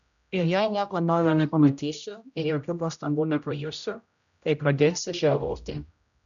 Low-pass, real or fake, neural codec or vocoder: 7.2 kHz; fake; codec, 16 kHz, 0.5 kbps, X-Codec, HuBERT features, trained on general audio